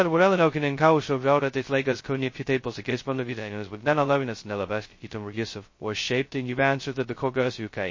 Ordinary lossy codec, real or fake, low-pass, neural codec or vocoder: MP3, 32 kbps; fake; 7.2 kHz; codec, 16 kHz, 0.2 kbps, FocalCodec